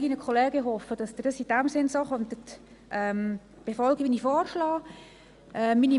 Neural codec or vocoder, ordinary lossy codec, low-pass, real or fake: none; Opus, 64 kbps; 10.8 kHz; real